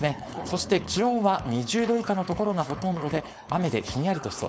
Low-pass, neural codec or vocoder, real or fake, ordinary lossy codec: none; codec, 16 kHz, 4.8 kbps, FACodec; fake; none